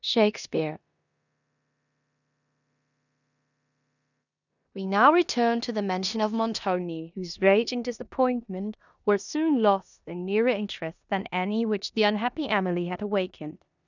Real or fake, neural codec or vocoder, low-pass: fake; codec, 16 kHz in and 24 kHz out, 0.9 kbps, LongCat-Audio-Codec, four codebook decoder; 7.2 kHz